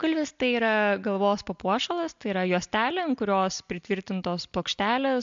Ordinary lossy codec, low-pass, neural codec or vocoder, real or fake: MP3, 64 kbps; 7.2 kHz; none; real